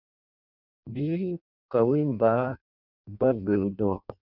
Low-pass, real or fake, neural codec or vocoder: 5.4 kHz; fake; codec, 16 kHz in and 24 kHz out, 0.6 kbps, FireRedTTS-2 codec